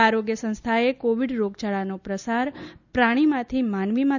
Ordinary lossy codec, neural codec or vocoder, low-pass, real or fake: none; none; 7.2 kHz; real